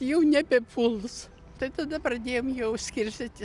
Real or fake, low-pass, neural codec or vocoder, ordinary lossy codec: real; 10.8 kHz; none; Opus, 64 kbps